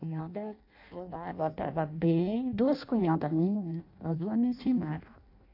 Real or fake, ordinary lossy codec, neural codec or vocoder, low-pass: fake; AAC, 32 kbps; codec, 16 kHz in and 24 kHz out, 0.6 kbps, FireRedTTS-2 codec; 5.4 kHz